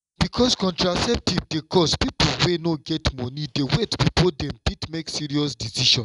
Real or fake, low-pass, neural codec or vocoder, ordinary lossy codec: real; 10.8 kHz; none; none